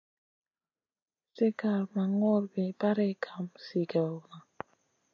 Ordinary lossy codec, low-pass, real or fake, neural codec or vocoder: AAC, 48 kbps; 7.2 kHz; real; none